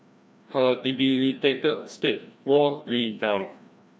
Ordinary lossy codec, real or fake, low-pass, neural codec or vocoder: none; fake; none; codec, 16 kHz, 1 kbps, FreqCodec, larger model